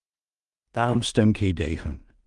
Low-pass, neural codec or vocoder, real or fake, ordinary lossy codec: 10.8 kHz; codec, 16 kHz in and 24 kHz out, 0.4 kbps, LongCat-Audio-Codec, two codebook decoder; fake; Opus, 32 kbps